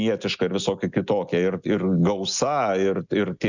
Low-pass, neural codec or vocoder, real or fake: 7.2 kHz; none; real